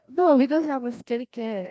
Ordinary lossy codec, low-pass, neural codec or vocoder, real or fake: none; none; codec, 16 kHz, 1 kbps, FreqCodec, larger model; fake